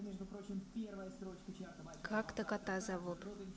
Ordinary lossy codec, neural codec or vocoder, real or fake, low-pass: none; none; real; none